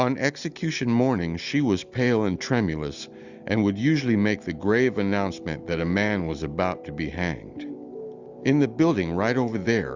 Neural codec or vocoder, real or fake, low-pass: none; real; 7.2 kHz